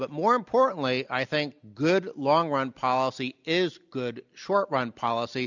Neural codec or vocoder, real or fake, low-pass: none; real; 7.2 kHz